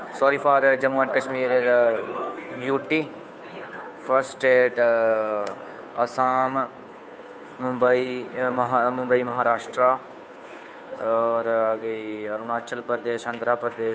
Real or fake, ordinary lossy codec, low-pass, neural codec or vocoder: fake; none; none; codec, 16 kHz, 2 kbps, FunCodec, trained on Chinese and English, 25 frames a second